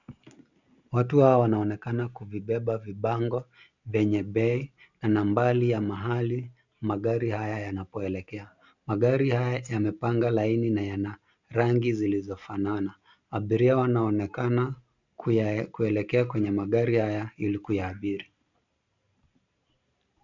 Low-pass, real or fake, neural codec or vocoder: 7.2 kHz; real; none